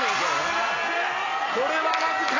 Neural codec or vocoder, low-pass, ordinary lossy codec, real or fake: none; 7.2 kHz; AAC, 32 kbps; real